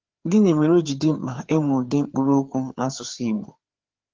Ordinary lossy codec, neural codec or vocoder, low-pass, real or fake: Opus, 16 kbps; codec, 16 kHz, 4 kbps, FreqCodec, larger model; 7.2 kHz; fake